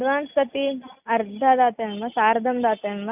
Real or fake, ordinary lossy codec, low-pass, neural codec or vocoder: real; none; 3.6 kHz; none